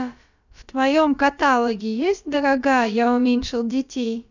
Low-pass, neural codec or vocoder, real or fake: 7.2 kHz; codec, 16 kHz, about 1 kbps, DyCAST, with the encoder's durations; fake